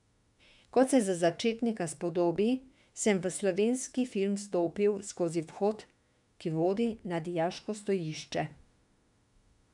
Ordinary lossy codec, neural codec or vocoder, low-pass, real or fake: none; autoencoder, 48 kHz, 32 numbers a frame, DAC-VAE, trained on Japanese speech; 10.8 kHz; fake